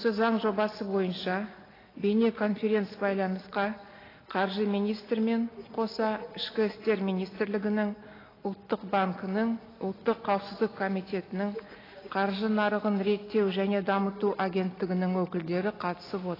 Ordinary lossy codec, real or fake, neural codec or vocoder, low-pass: AAC, 24 kbps; real; none; 5.4 kHz